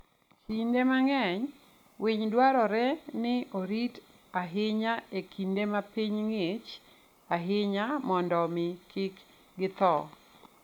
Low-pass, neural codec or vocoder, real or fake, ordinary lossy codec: 19.8 kHz; none; real; none